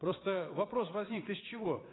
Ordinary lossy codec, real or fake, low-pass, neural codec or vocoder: AAC, 16 kbps; fake; 7.2 kHz; vocoder, 22.05 kHz, 80 mel bands, Vocos